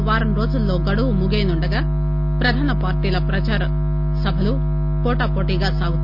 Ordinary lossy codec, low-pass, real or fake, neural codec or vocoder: none; 5.4 kHz; real; none